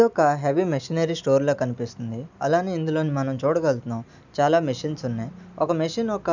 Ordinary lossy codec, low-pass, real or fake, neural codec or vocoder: none; 7.2 kHz; real; none